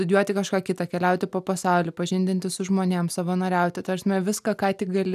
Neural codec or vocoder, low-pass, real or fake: none; 14.4 kHz; real